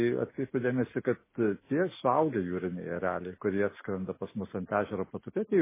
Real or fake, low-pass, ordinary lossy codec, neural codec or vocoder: real; 3.6 kHz; MP3, 16 kbps; none